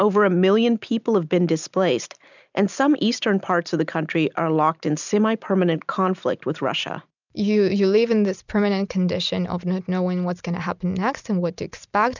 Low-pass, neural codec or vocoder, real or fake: 7.2 kHz; none; real